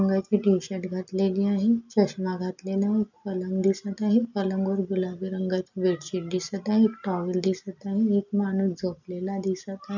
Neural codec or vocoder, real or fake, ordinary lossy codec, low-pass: none; real; none; 7.2 kHz